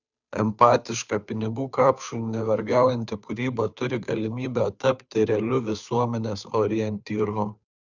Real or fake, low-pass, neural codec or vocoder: fake; 7.2 kHz; codec, 16 kHz, 2 kbps, FunCodec, trained on Chinese and English, 25 frames a second